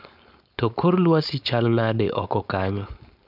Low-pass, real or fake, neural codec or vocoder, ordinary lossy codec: 5.4 kHz; fake; codec, 16 kHz, 4.8 kbps, FACodec; none